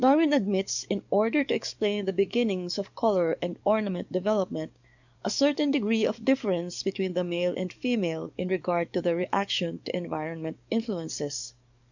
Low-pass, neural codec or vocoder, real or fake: 7.2 kHz; codec, 44.1 kHz, 7.8 kbps, DAC; fake